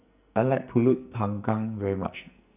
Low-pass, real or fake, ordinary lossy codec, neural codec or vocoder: 3.6 kHz; fake; none; codec, 44.1 kHz, 2.6 kbps, SNAC